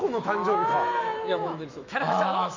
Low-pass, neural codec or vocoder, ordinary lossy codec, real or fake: 7.2 kHz; autoencoder, 48 kHz, 128 numbers a frame, DAC-VAE, trained on Japanese speech; MP3, 64 kbps; fake